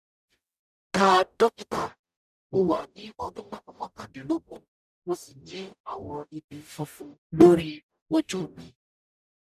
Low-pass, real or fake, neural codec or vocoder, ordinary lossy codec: 14.4 kHz; fake; codec, 44.1 kHz, 0.9 kbps, DAC; none